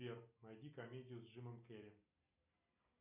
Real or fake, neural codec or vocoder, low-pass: real; none; 3.6 kHz